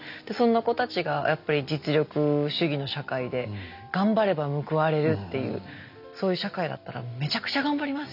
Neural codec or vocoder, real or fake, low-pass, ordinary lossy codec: none; real; 5.4 kHz; none